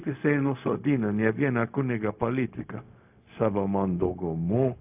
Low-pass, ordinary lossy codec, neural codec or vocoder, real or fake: 3.6 kHz; AAC, 32 kbps; codec, 16 kHz, 0.4 kbps, LongCat-Audio-Codec; fake